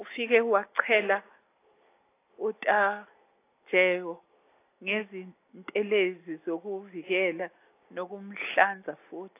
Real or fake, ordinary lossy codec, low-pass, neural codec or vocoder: real; AAC, 24 kbps; 3.6 kHz; none